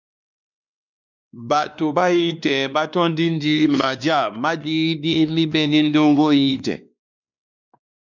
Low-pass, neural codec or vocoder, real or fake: 7.2 kHz; codec, 16 kHz, 2 kbps, X-Codec, WavLM features, trained on Multilingual LibriSpeech; fake